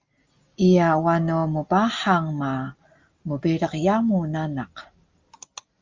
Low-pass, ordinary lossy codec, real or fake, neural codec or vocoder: 7.2 kHz; Opus, 32 kbps; real; none